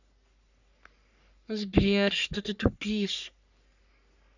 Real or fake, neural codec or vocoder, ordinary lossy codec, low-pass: fake; codec, 44.1 kHz, 3.4 kbps, Pupu-Codec; none; 7.2 kHz